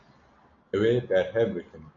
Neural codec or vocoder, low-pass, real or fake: none; 7.2 kHz; real